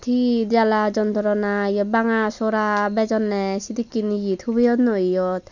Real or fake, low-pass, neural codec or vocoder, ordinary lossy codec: real; 7.2 kHz; none; none